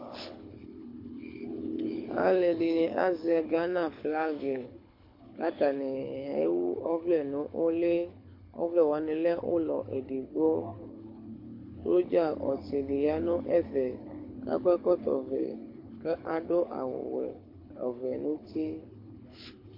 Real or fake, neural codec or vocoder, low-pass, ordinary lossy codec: fake; codec, 24 kHz, 6 kbps, HILCodec; 5.4 kHz; MP3, 32 kbps